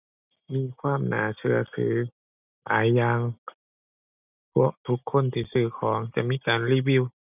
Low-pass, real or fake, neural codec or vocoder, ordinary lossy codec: 3.6 kHz; real; none; none